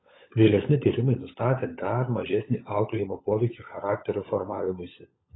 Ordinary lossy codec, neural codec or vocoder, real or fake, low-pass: AAC, 16 kbps; codec, 16 kHz, 16 kbps, FunCodec, trained on LibriTTS, 50 frames a second; fake; 7.2 kHz